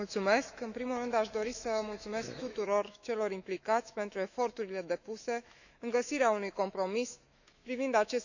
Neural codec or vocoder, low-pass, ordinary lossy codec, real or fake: autoencoder, 48 kHz, 128 numbers a frame, DAC-VAE, trained on Japanese speech; 7.2 kHz; none; fake